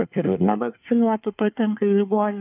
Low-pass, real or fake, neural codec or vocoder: 3.6 kHz; fake; codec, 24 kHz, 1 kbps, SNAC